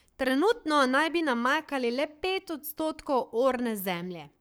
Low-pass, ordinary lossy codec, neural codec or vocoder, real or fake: none; none; codec, 44.1 kHz, 7.8 kbps, Pupu-Codec; fake